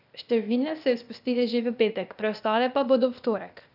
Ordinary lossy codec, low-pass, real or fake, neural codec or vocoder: none; 5.4 kHz; fake; codec, 16 kHz, 0.8 kbps, ZipCodec